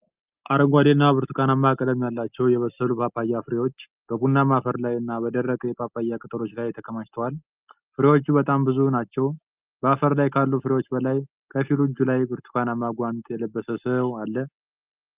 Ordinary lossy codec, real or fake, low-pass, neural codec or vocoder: Opus, 24 kbps; real; 3.6 kHz; none